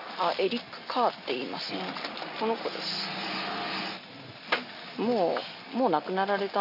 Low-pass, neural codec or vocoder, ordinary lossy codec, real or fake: 5.4 kHz; none; none; real